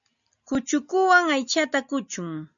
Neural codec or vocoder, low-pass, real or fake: none; 7.2 kHz; real